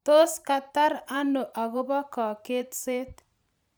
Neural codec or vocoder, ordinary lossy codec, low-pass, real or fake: vocoder, 44.1 kHz, 128 mel bands every 512 samples, BigVGAN v2; none; none; fake